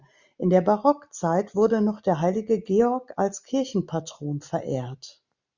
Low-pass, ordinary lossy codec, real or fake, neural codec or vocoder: 7.2 kHz; Opus, 64 kbps; real; none